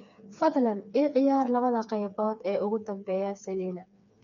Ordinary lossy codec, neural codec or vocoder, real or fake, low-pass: MP3, 64 kbps; codec, 16 kHz, 4 kbps, FreqCodec, smaller model; fake; 7.2 kHz